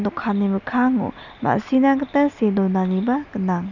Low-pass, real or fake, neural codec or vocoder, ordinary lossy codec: 7.2 kHz; real; none; none